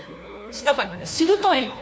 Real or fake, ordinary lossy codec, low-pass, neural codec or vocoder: fake; none; none; codec, 16 kHz, 1 kbps, FunCodec, trained on LibriTTS, 50 frames a second